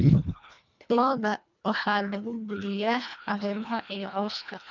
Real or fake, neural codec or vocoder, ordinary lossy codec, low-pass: fake; codec, 24 kHz, 1.5 kbps, HILCodec; none; 7.2 kHz